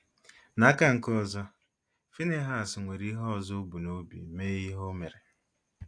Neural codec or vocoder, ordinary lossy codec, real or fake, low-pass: none; AAC, 48 kbps; real; 9.9 kHz